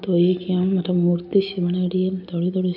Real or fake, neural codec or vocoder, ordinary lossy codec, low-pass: real; none; none; 5.4 kHz